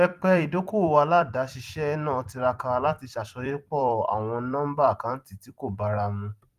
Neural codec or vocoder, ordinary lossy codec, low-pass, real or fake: vocoder, 44.1 kHz, 128 mel bands every 256 samples, BigVGAN v2; Opus, 24 kbps; 14.4 kHz; fake